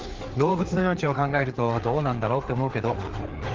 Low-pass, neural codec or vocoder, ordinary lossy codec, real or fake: 7.2 kHz; codec, 16 kHz, 4 kbps, FreqCodec, larger model; Opus, 16 kbps; fake